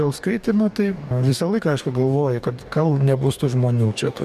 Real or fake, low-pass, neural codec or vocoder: fake; 14.4 kHz; codec, 44.1 kHz, 2.6 kbps, DAC